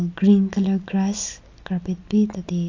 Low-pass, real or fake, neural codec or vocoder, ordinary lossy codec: 7.2 kHz; real; none; AAC, 48 kbps